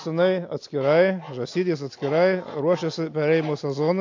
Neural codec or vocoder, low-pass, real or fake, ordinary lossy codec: none; 7.2 kHz; real; AAC, 48 kbps